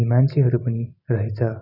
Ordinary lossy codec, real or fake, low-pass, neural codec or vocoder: none; real; 5.4 kHz; none